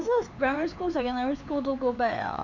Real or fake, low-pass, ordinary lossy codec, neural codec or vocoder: fake; 7.2 kHz; MP3, 64 kbps; codec, 16 kHz, 4 kbps, X-Codec, HuBERT features, trained on LibriSpeech